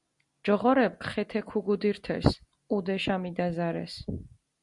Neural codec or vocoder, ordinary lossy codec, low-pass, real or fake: none; Opus, 64 kbps; 10.8 kHz; real